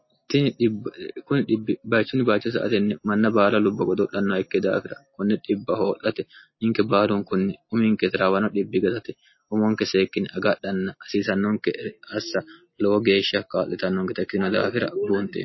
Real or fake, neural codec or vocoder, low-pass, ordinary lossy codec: real; none; 7.2 kHz; MP3, 24 kbps